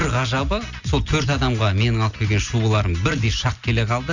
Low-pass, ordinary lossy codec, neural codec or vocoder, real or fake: 7.2 kHz; none; none; real